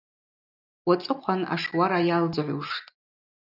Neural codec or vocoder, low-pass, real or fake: none; 5.4 kHz; real